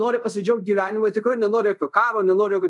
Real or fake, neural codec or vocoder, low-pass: fake; codec, 24 kHz, 0.5 kbps, DualCodec; 10.8 kHz